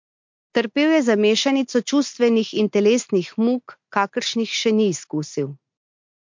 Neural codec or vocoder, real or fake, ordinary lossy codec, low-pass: none; real; MP3, 48 kbps; 7.2 kHz